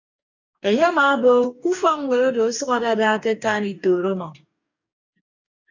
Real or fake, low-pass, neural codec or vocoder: fake; 7.2 kHz; codec, 44.1 kHz, 2.6 kbps, DAC